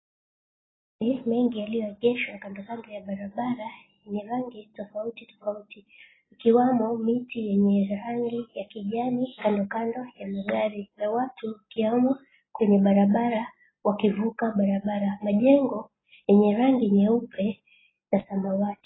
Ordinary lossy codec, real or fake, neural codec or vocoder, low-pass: AAC, 16 kbps; real; none; 7.2 kHz